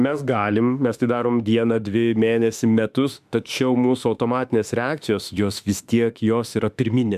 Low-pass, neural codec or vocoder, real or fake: 14.4 kHz; autoencoder, 48 kHz, 32 numbers a frame, DAC-VAE, trained on Japanese speech; fake